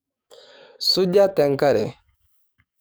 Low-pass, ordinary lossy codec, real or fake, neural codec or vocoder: none; none; fake; codec, 44.1 kHz, 7.8 kbps, DAC